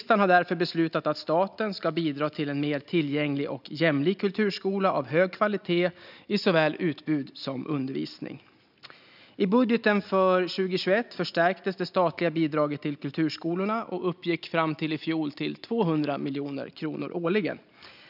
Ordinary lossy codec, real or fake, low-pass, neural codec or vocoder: none; real; 5.4 kHz; none